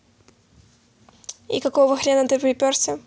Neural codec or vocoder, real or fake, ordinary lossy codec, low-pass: none; real; none; none